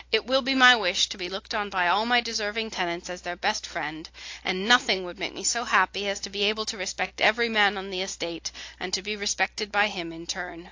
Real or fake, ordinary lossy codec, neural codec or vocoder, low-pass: real; AAC, 48 kbps; none; 7.2 kHz